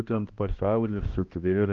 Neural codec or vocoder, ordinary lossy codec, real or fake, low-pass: codec, 16 kHz, 1 kbps, X-Codec, HuBERT features, trained on balanced general audio; Opus, 24 kbps; fake; 7.2 kHz